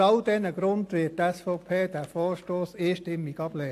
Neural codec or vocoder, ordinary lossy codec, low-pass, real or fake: none; AAC, 64 kbps; 14.4 kHz; real